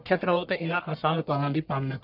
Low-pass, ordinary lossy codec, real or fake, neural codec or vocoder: 5.4 kHz; none; fake; codec, 44.1 kHz, 1.7 kbps, Pupu-Codec